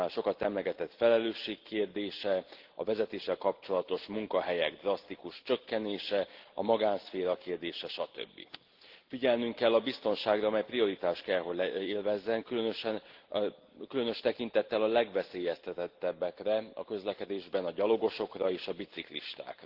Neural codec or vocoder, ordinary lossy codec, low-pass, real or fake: none; Opus, 16 kbps; 5.4 kHz; real